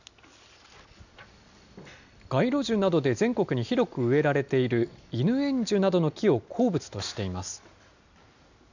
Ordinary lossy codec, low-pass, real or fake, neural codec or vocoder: none; 7.2 kHz; real; none